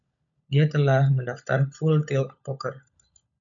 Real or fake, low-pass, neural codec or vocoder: fake; 7.2 kHz; codec, 16 kHz, 16 kbps, FunCodec, trained on LibriTTS, 50 frames a second